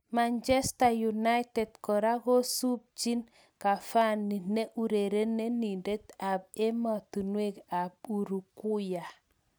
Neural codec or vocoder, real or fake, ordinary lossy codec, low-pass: none; real; none; none